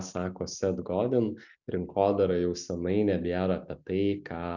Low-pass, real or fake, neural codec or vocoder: 7.2 kHz; real; none